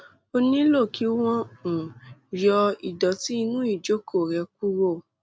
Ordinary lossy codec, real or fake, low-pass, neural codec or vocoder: none; real; none; none